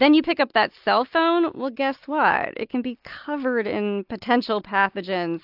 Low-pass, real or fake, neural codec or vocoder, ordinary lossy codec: 5.4 kHz; real; none; AAC, 48 kbps